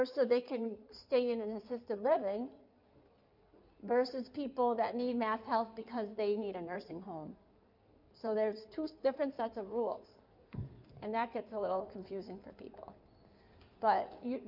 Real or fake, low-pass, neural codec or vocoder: fake; 5.4 kHz; codec, 16 kHz in and 24 kHz out, 2.2 kbps, FireRedTTS-2 codec